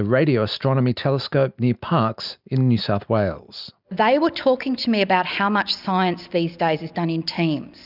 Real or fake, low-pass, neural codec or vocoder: real; 5.4 kHz; none